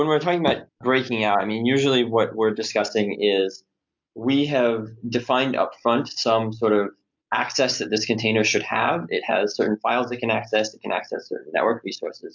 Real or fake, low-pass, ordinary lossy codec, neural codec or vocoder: real; 7.2 kHz; MP3, 64 kbps; none